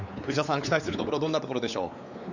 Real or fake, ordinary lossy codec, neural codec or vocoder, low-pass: fake; none; codec, 16 kHz, 4 kbps, X-Codec, WavLM features, trained on Multilingual LibriSpeech; 7.2 kHz